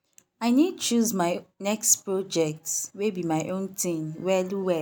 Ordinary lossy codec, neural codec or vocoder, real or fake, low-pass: none; none; real; none